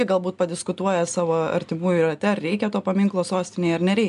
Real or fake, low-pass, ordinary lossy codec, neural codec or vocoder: real; 10.8 kHz; MP3, 96 kbps; none